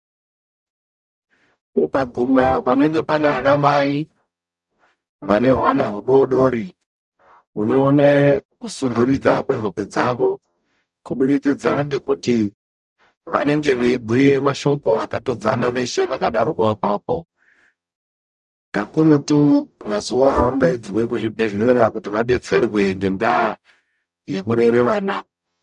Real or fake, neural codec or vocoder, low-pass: fake; codec, 44.1 kHz, 0.9 kbps, DAC; 10.8 kHz